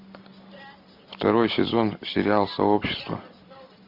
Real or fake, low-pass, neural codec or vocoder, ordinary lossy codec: real; 5.4 kHz; none; MP3, 48 kbps